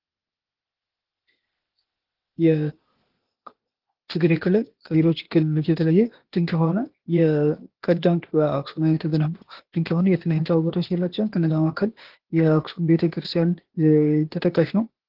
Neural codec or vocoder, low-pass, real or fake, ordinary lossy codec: codec, 16 kHz, 0.8 kbps, ZipCodec; 5.4 kHz; fake; Opus, 16 kbps